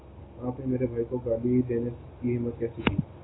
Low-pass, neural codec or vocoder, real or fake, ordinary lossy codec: 7.2 kHz; none; real; AAC, 16 kbps